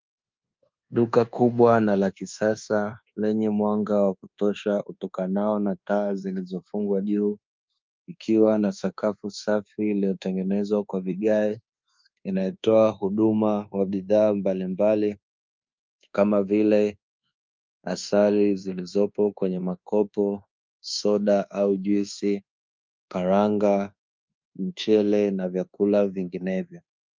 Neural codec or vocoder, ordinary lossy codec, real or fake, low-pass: codec, 24 kHz, 1.2 kbps, DualCodec; Opus, 24 kbps; fake; 7.2 kHz